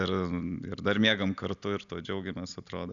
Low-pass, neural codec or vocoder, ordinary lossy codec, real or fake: 7.2 kHz; none; Opus, 64 kbps; real